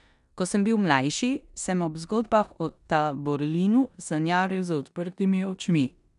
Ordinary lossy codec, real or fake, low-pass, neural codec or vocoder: none; fake; 10.8 kHz; codec, 16 kHz in and 24 kHz out, 0.9 kbps, LongCat-Audio-Codec, four codebook decoder